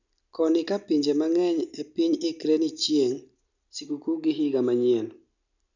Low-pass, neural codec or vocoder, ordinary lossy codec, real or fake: 7.2 kHz; none; none; real